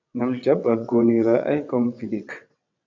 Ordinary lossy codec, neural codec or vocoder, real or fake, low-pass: AAC, 48 kbps; vocoder, 22.05 kHz, 80 mel bands, WaveNeXt; fake; 7.2 kHz